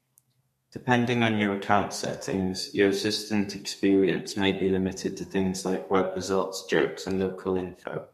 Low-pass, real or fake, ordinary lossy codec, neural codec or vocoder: 14.4 kHz; fake; MP3, 64 kbps; codec, 32 kHz, 1.9 kbps, SNAC